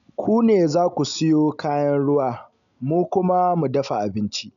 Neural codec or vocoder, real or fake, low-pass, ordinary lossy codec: none; real; 7.2 kHz; none